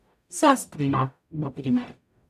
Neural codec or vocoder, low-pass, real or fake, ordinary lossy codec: codec, 44.1 kHz, 0.9 kbps, DAC; 14.4 kHz; fake; none